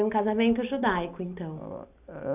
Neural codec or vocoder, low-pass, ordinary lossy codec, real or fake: none; 3.6 kHz; none; real